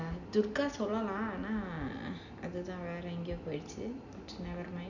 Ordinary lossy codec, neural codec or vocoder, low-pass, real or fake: none; none; 7.2 kHz; real